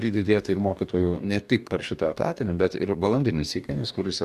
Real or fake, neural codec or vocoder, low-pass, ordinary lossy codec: fake; codec, 44.1 kHz, 2.6 kbps, DAC; 14.4 kHz; AAC, 96 kbps